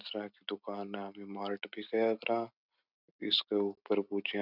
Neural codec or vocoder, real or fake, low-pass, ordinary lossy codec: none; real; 5.4 kHz; none